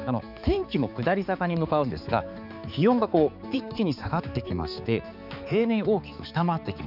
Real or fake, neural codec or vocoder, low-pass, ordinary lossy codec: fake; codec, 16 kHz, 4 kbps, X-Codec, HuBERT features, trained on balanced general audio; 5.4 kHz; none